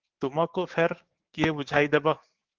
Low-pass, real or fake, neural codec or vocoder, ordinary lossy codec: 7.2 kHz; fake; codec, 16 kHz, 6 kbps, DAC; Opus, 16 kbps